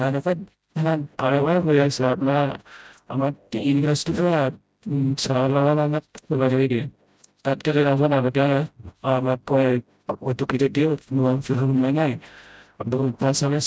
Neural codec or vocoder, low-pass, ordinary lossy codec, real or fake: codec, 16 kHz, 0.5 kbps, FreqCodec, smaller model; none; none; fake